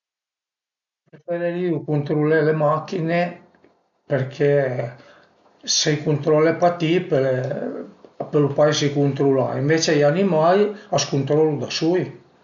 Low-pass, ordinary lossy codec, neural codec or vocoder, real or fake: 7.2 kHz; none; none; real